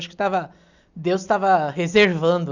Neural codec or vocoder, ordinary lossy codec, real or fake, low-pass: none; none; real; 7.2 kHz